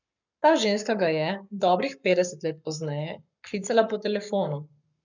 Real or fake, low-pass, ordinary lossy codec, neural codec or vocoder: fake; 7.2 kHz; none; codec, 44.1 kHz, 7.8 kbps, Pupu-Codec